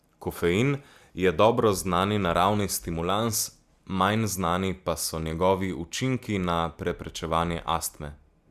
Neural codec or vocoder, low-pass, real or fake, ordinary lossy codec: none; 14.4 kHz; real; Opus, 64 kbps